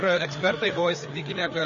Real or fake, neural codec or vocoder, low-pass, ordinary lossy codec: fake; codec, 16 kHz, 4 kbps, FreqCodec, larger model; 7.2 kHz; MP3, 32 kbps